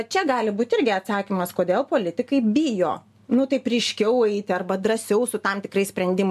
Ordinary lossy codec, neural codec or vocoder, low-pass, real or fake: MP3, 96 kbps; none; 14.4 kHz; real